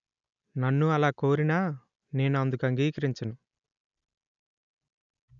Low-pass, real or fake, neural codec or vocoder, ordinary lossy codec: 7.2 kHz; real; none; none